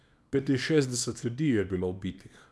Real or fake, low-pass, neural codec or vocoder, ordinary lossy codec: fake; none; codec, 24 kHz, 0.9 kbps, WavTokenizer, small release; none